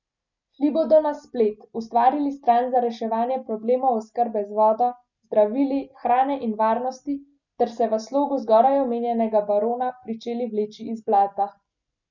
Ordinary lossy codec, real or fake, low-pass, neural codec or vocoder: none; real; 7.2 kHz; none